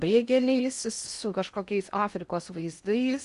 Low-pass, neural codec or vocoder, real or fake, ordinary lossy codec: 10.8 kHz; codec, 16 kHz in and 24 kHz out, 0.8 kbps, FocalCodec, streaming, 65536 codes; fake; Opus, 64 kbps